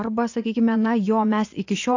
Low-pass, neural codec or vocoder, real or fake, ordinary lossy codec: 7.2 kHz; vocoder, 24 kHz, 100 mel bands, Vocos; fake; AAC, 48 kbps